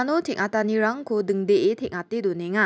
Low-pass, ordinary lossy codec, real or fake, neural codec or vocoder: none; none; real; none